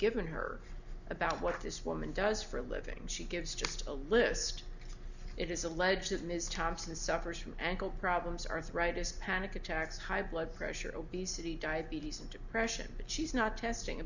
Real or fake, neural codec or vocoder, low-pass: real; none; 7.2 kHz